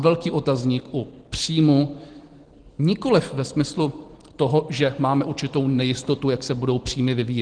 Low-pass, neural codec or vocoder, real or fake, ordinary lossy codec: 9.9 kHz; none; real; Opus, 16 kbps